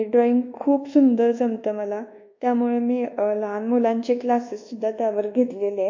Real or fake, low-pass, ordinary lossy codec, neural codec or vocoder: fake; 7.2 kHz; MP3, 48 kbps; codec, 24 kHz, 1.2 kbps, DualCodec